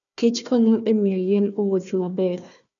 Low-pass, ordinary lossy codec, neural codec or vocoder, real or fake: 7.2 kHz; none; codec, 16 kHz, 1 kbps, FunCodec, trained on Chinese and English, 50 frames a second; fake